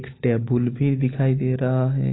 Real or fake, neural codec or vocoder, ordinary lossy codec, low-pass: real; none; AAC, 16 kbps; 7.2 kHz